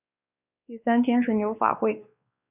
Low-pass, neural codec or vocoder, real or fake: 3.6 kHz; codec, 16 kHz, 2 kbps, X-Codec, WavLM features, trained on Multilingual LibriSpeech; fake